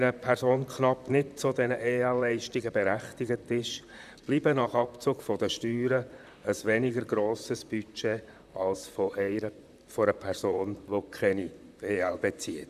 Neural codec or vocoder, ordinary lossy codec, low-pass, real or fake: vocoder, 44.1 kHz, 128 mel bands, Pupu-Vocoder; none; 14.4 kHz; fake